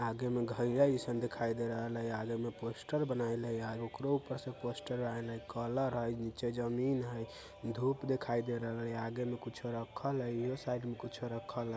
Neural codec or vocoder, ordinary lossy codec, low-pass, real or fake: none; none; none; real